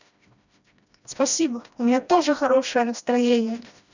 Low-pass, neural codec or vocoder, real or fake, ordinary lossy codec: 7.2 kHz; codec, 16 kHz, 1 kbps, FreqCodec, smaller model; fake; none